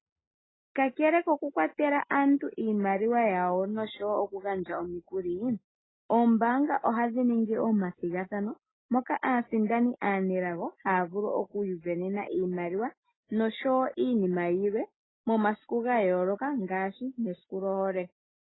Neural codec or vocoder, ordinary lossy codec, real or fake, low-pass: none; AAC, 16 kbps; real; 7.2 kHz